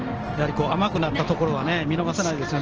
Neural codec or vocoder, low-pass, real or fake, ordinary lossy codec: none; 7.2 kHz; real; Opus, 16 kbps